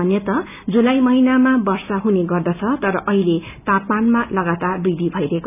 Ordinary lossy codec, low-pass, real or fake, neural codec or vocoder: none; 3.6 kHz; real; none